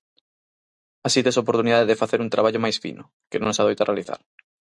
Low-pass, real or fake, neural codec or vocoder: 10.8 kHz; real; none